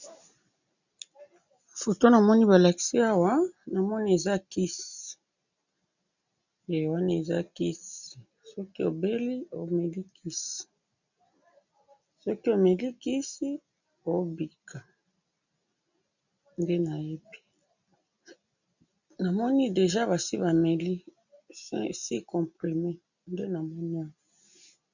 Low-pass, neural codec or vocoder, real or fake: 7.2 kHz; none; real